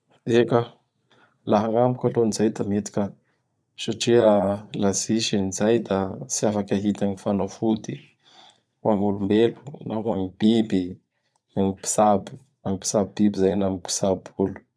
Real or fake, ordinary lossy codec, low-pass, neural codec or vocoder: fake; none; none; vocoder, 22.05 kHz, 80 mel bands, Vocos